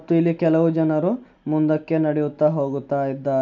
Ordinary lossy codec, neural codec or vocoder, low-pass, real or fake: none; none; 7.2 kHz; real